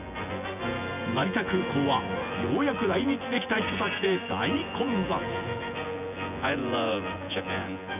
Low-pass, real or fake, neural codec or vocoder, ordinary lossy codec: 3.6 kHz; fake; vocoder, 24 kHz, 100 mel bands, Vocos; none